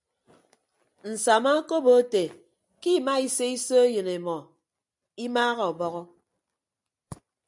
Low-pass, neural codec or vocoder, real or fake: 10.8 kHz; none; real